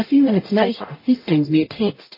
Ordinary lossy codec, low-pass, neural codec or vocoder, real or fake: MP3, 24 kbps; 5.4 kHz; codec, 44.1 kHz, 0.9 kbps, DAC; fake